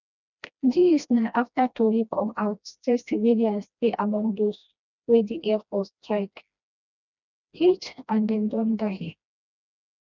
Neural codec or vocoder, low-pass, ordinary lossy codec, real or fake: codec, 16 kHz, 1 kbps, FreqCodec, smaller model; 7.2 kHz; none; fake